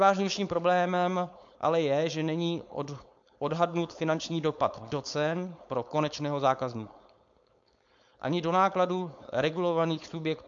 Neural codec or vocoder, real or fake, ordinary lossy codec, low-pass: codec, 16 kHz, 4.8 kbps, FACodec; fake; AAC, 64 kbps; 7.2 kHz